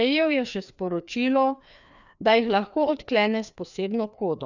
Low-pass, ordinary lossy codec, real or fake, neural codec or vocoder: 7.2 kHz; none; fake; codec, 16 kHz, 2 kbps, FreqCodec, larger model